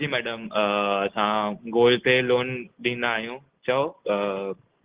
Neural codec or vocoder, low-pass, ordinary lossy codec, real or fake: none; 3.6 kHz; Opus, 16 kbps; real